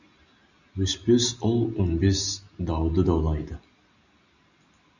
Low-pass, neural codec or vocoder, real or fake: 7.2 kHz; none; real